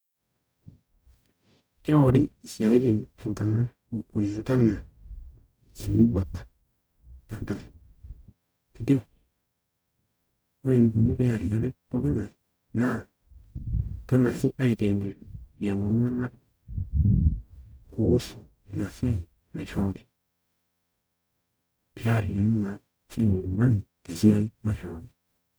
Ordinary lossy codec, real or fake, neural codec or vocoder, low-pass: none; fake; codec, 44.1 kHz, 0.9 kbps, DAC; none